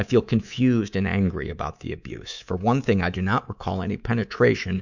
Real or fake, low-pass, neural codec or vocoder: fake; 7.2 kHz; codec, 24 kHz, 3.1 kbps, DualCodec